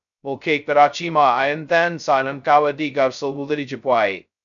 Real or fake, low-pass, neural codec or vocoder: fake; 7.2 kHz; codec, 16 kHz, 0.2 kbps, FocalCodec